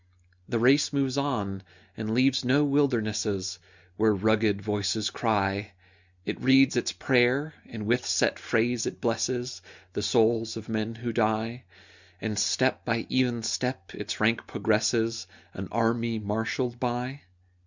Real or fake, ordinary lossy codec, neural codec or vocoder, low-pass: real; Opus, 64 kbps; none; 7.2 kHz